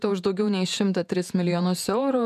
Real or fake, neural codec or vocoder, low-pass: fake; vocoder, 48 kHz, 128 mel bands, Vocos; 14.4 kHz